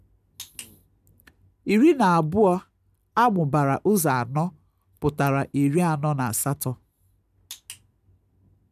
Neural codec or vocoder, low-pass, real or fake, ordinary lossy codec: none; 14.4 kHz; real; none